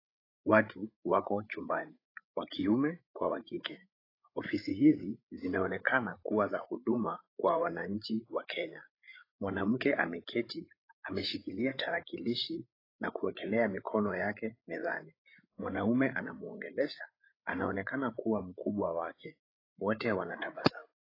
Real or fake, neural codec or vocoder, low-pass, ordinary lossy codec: fake; codec, 16 kHz, 8 kbps, FreqCodec, larger model; 5.4 kHz; AAC, 24 kbps